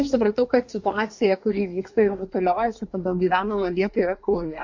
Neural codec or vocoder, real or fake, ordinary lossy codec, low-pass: codec, 24 kHz, 1 kbps, SNAC; fake; MP3, 48 kbps; 7.2 kHz